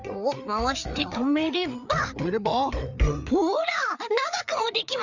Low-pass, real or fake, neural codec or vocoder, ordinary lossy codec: 7.2 kHz; fake; codec, 16 kHz, 4 kbps, FreqCodec, larger model; none